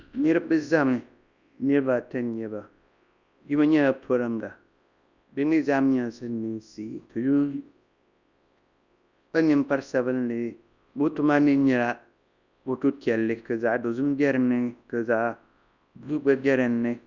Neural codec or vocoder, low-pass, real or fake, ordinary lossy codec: codec, 24 kHz, 0.9 kbps, WavTokenizer, large speech release; 7.2 kHz; fake; Opus, 64 kbps